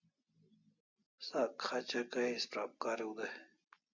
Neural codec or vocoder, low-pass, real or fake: none; 7.2 kHz; real